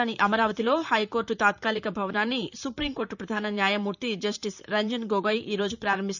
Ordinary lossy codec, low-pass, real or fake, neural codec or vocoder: none; 7.2 kHz; fake; vocoder, 44.1 kHz, 128 mel bands, Pupu-Vocoder